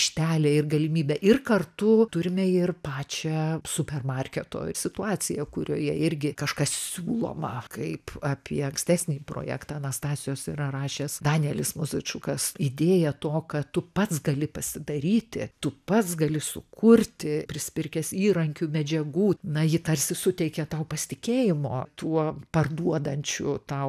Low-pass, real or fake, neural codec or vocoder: 14.4 kHz; real; none